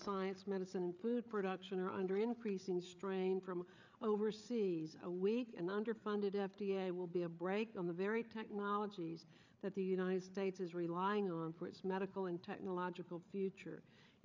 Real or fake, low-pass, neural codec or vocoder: fake; 7.2 kHz; codec, 16 kHz, 8 kbps, FreqCodec, larger model